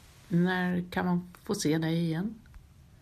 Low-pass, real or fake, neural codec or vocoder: 14.4 kHz; real; none